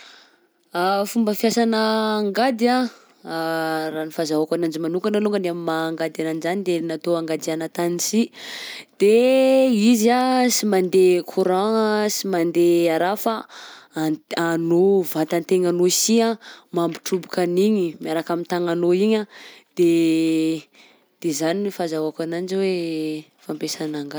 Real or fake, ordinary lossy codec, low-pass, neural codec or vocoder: real; none; none; none